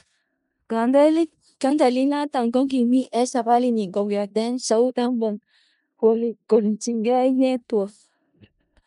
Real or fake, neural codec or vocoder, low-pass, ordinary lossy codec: fake; codec, 16 kHz in and 24 kHz out, 0.4 kbps, LongCat-Audio-Codec, four codebook decoder; 10.8 kHz; none